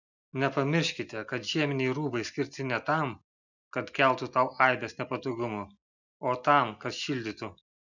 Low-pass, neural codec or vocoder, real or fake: 7.2 kHz; none; real